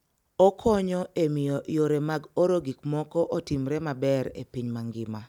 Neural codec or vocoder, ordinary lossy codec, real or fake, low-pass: none; none; real; 19.8 kHz